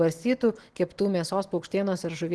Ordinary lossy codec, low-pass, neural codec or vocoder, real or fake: Opus, 16 kbps; 10.8 kHz; none; real